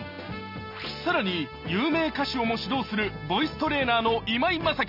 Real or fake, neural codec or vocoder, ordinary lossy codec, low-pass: real; none; none; 5.4 kHz